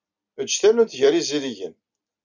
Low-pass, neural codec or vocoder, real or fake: 7.2 kHz; none; real